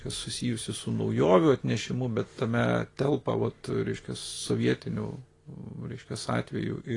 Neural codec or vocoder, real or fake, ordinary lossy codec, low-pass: none; real; AAC, 32 kbps; 10.8 kHz